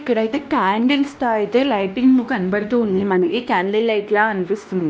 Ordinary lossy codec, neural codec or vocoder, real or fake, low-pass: none; codec, 16 kHz, 1 kbps, X-Codec, WavLM features, trained on Multilingual LibriSpeech; fake; none